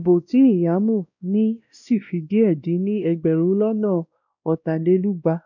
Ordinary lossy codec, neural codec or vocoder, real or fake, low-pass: none; codec, 16 kHz, 1 kbps, X-Codec, WavLM features, trained on Multilingual LibriSpeech; fake; 7.2 kHz